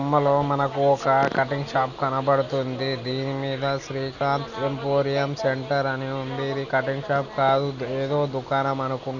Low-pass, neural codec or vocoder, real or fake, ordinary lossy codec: 7.2 kHz; none; real; none